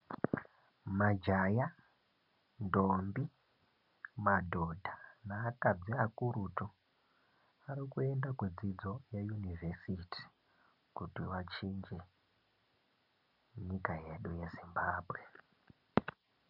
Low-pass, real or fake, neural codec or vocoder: 5.4 kHz; real; none